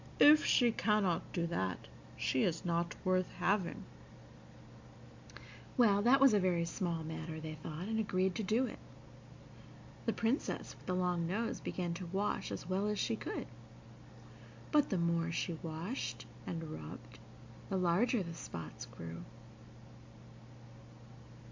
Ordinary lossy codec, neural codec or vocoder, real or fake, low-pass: MP3, 64 kbps; none; real; 7.2 kHz